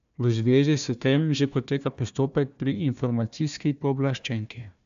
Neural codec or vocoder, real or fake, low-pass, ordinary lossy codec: codec, 16 kHz, 1 kbps, FunCodec, trained on Chinese and English, 50 frames a second; fake; 7.2 kHz; AAC, 96 kbps